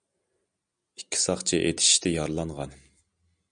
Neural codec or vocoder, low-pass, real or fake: none; 9.9 kHz; real